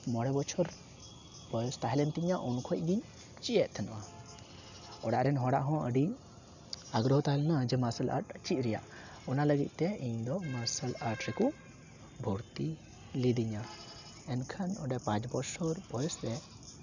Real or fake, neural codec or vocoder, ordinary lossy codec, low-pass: real; none; none; 7.2 kHz